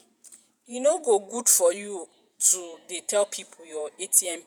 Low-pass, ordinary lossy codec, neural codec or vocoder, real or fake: none; none; vocoder, 48 kHz, 128 mel bands, Vocos; fake